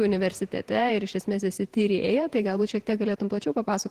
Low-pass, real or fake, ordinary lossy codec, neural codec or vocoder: 14.4 kHz; fake; Opus, 16 kbps; vocoder, 48 kHz, 128 mel bands, Vocos